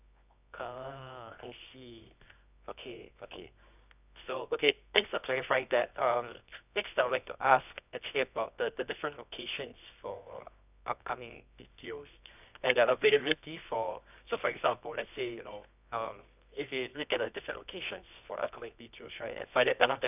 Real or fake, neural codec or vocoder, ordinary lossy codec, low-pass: fake; codec, 24 kHz, 0.9 kbps, WavTokenizer, medium music audio release; none; 3.6 kHz